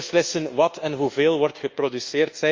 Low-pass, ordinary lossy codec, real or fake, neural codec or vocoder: 7.2 kHz; Opus, 32 kbps; fake; codec, 24 kHz, 1.2 kbps, DualCodec